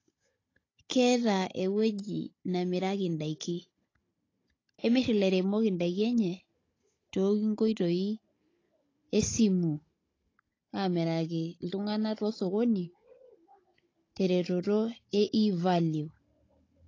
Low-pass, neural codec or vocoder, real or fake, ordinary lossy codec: 7.2 kHz; codec, 16 kHz, 16 kbps, FunCodec, trained on Chinese and English, 50 frames a second; fake; AAC, 32 kbps